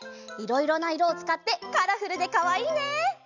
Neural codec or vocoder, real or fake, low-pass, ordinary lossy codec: none; real; 7.2 kHz; none